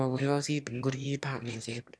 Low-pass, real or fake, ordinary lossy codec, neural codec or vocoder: none; fake; none; autoencoder, 22.05 kHz, a latent of 192 numbers a frame, VITS, trained on one speaker